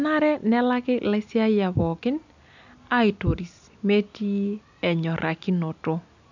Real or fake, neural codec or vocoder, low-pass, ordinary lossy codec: real; none; 7.2 kHz; none